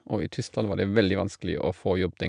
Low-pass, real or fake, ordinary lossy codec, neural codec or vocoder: 9.9 kHz; real; none; none